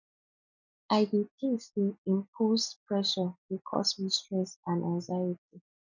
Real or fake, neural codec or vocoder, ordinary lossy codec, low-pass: real; none; none; 7.2 kHz